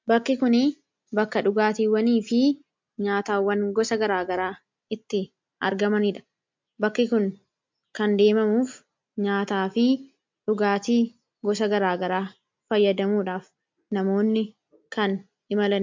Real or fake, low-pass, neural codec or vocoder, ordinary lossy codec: real; 7.2 kHz; none; MP3, 64 kbps